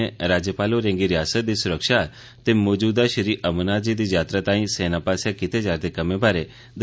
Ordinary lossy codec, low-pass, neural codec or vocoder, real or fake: none; none; none; real